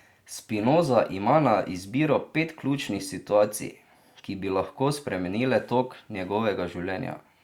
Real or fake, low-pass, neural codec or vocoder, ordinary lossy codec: real; 19.8 kHz; none; Opus, 64 kbps